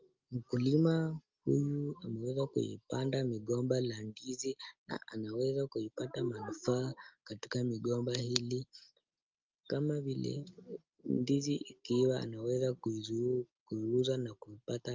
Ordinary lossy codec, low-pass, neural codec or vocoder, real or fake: Opus, 24 kbps; 7.2 kHz; none; real